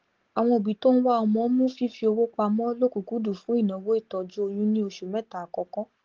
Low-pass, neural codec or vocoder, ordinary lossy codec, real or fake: 7.2 kHz; none; Opus, 16 kbps; real